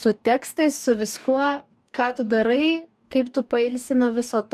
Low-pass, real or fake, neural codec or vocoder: 14.4 kHz; fake; codec, 44.1 kHz, 2.6 kbps, DAC